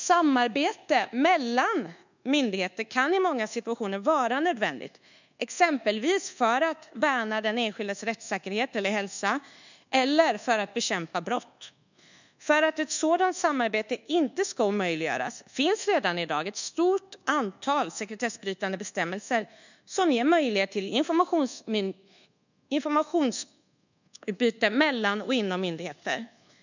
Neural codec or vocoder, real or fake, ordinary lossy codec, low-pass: codec, 24 kHz, 1.2 kbps, DualCodec; fake; none; 7.2 kHz